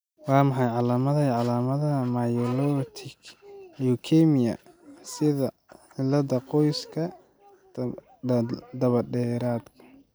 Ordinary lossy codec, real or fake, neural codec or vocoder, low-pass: none; real; none; none